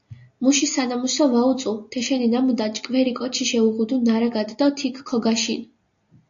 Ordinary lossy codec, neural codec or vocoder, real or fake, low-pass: AAC, 64 kbps; none; real; 7.2 kHz